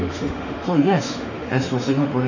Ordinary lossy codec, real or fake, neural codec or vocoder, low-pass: none; fake; autoencoder, 48 kHz, 32 numbers a frame, DAC-VAE, trained on Japanese speech; 7.2 kHz